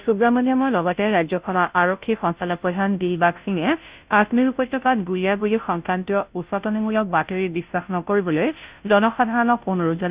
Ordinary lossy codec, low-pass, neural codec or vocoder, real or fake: Opus, 64 kbps; 3.6 kHz; codec, 16 kHz, 0.5 kbps, FunCodec, trained on Chinese and English, 25 frames a second; fake